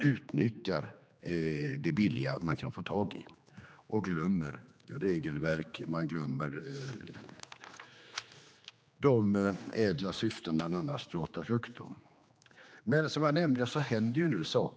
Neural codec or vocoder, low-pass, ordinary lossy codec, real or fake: codec, 16 kHz, 2 kbps, X-Codec, HuBERT features, trained on general audio; none; none; fake